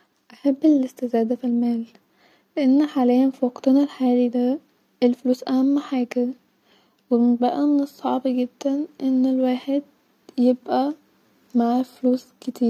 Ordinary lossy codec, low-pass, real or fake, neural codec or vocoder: none; 19.8 kHz; real; none